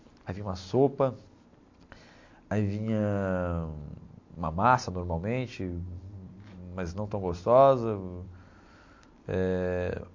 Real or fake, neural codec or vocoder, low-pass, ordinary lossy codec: real; none; 7.2 kHz; MP3, 48 kbps